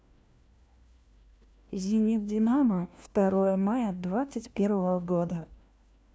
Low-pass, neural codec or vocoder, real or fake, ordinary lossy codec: none; codec, 16 kHz, 1 kbps, FunCodec, trained on LibriTTS, 50 frames a second; fake; none